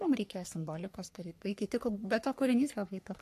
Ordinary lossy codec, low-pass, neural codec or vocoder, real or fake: AAC, 64 kbps; 14.4 kHz; codec, 44.1 kHz, 3.4 kbps, Pupu-Codec; fake